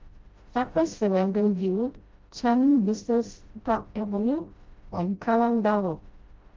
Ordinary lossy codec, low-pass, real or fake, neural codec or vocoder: Opus, 32 kbps; 7.2 kHz; fake; codec, 16 kHz, 0.5 kbps, FreqCodec, smaller model